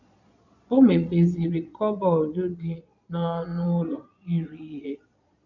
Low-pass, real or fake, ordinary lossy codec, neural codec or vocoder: 7.2 kHz; fake; none; vocoder, 22.05 kHz, 80 mel bands, Vocos